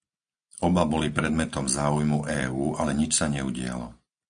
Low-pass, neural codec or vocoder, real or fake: 9.9 kHz; none; real